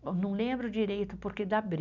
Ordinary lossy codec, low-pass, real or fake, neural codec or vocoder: none; 7.2 kHz; real; none